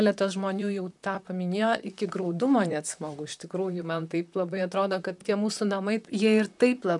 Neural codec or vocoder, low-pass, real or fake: vocoder, 44.1 kHz, 128 mel bands, Pupu-Vocoder; 10.8 kHz; fake